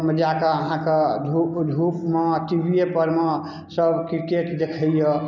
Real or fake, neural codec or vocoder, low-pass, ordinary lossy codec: real; none; 7.2 kHz; none